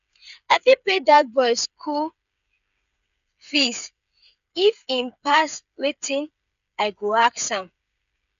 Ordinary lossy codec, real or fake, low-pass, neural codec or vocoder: none; fake; 7.2 kHz; codec, 16 kHz, 8 kbps, FreqCodec, smaller model